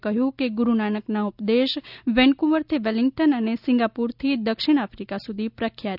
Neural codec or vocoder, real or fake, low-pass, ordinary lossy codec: none; real; 5.4 kHz; none